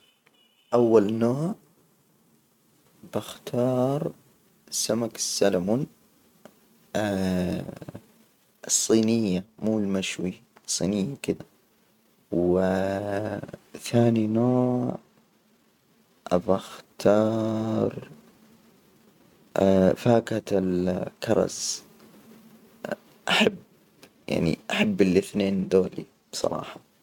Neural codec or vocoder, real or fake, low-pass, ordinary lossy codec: vocoder, 44.1 kHz, 128 mel bands every 512 samples, BigVGAN v2; fake; 19.8 kHz; none